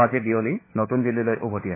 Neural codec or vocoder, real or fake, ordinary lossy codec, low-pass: codec, 16 kHz, 4 kbps, FunCodec, trained on LibriTTS, 50 frames a second; fake; MP3, 16 kbps; 3.6 kHz